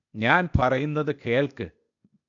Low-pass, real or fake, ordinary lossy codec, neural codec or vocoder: 7.2 kHz; fake; MP3, 96 kbps; codec, 16 kHz, 0.8 kbps, ZipCodec